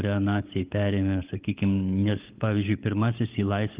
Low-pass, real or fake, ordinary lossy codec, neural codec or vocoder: 3.6 kHz; fake; Opus, 32 kbps; codec, 16 kHz, 16 kbps, FunCodec, trained on LibriTTS, 50 frames a second